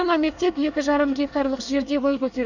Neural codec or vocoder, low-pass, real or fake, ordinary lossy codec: codec, 24 kHz, 1 kbps, SNAC; 7.2 kHz; fake; none